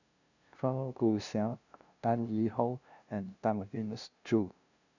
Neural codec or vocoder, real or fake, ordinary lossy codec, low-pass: codec, 16 kHz, 0.5 kbps, FunCodec, trained on LibriTTS, 25 frames a second; fake; none; 7.2 kHz